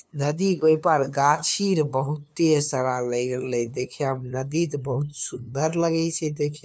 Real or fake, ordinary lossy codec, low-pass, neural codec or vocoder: fake; none; none; codec, 16 kHz, 2 kbps, FunCodec, trained on LibriTTS, 25 frames a second